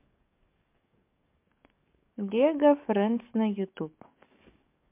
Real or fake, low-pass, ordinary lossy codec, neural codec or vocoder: fake; 3.6 kHz; MP3, 32 kbps; codec, 44.1 kHz, 7.8 kbps, DAC